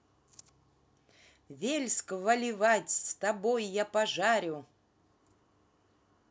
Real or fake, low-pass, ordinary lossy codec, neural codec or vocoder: real; none; none; none